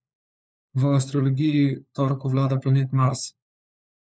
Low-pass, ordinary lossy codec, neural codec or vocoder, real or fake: none; none; codec, 16 kHz, 4 kbps, FunCodec, trained on LibriTTS, 50 frames a second; fake